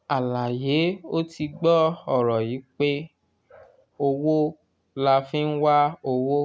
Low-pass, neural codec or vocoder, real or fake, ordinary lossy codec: none; none; real; none